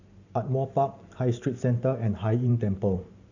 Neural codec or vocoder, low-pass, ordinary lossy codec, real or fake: vocoder, 44.1 kHz, 128 mel bands every 512 samples, BigVGAN v2; 7.2 kHz; none; fake